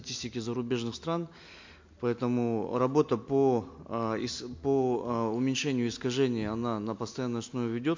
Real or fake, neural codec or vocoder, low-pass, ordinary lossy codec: real; none; 7.2 kHz; MP3, 48 kbps